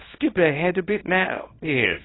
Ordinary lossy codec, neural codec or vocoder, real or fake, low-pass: AAC, 16 kbps; codec, 24 kHz, 0.9 kbps, WavTokenizer, small release; fake; 7.2 kHz